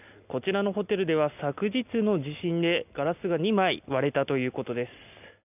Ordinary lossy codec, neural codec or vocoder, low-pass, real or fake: none; none; 3.6 kHz; real